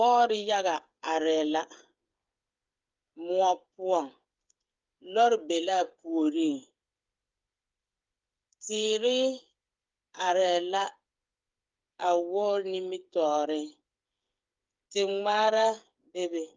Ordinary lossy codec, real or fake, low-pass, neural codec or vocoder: Opus, 32 kbps; fake; 7.2 kHz; codec, 16 kHz, 8 kbps, FreqCodec, smaller model